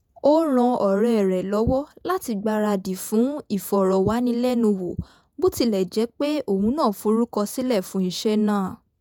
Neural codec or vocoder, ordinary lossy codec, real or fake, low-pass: vocoder, 48 kHz, 128 mel bands, Vocos; none; fake; none